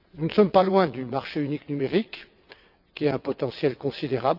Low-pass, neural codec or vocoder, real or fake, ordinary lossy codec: 5.4 kHz; vocoder, 22.05 kHz, 80 mel bands, WaveNeXt; fake; none